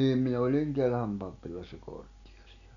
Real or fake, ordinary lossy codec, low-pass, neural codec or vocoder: real; none; 7.2 kHz; none